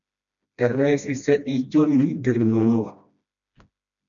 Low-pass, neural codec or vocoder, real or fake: 7.2 kHz; codec, 16 kHz, 1 kbps, FreqCodec, smaller model; fake